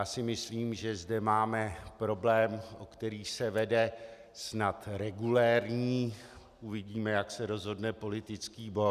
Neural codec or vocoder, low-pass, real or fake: none; 14.4 kHz; real